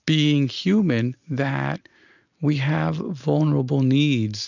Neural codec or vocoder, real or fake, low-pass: none; real; 7.2 kHz